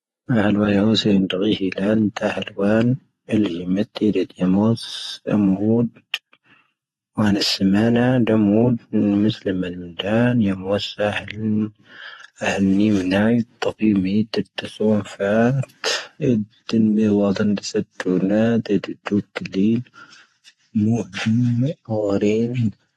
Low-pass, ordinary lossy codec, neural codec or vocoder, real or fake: 19.8 kHz; AAC, 32 kbps; vocoder, 48 kHz, 128 mel bands, Vocos; fake